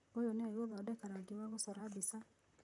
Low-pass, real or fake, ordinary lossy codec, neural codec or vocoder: 10.8 kHz; fake; none; vocoder, 44.1 kHz, 128 mel bands, Pupu-Vocoder